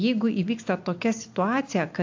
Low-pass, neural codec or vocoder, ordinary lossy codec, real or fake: 7.2 kHz; none; AAC, 48 kbps; real